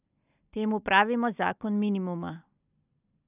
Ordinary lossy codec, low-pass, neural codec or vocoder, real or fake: none; 3.6 kHz; none; real